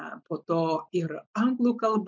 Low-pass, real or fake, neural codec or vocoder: 7.2 kHz; real; none